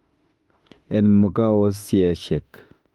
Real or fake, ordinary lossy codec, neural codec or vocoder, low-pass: fake; Opus, 32 kbps; autoencoder, 48 kHz, 32 numbers a frame, DAC-VAE, trained on Japanese speech; 19.8 kHz